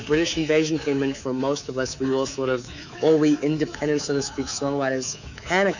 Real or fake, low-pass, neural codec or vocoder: fake; 7.2 kHz; codec, 24 kHz, 3.1 kbps, DualCodec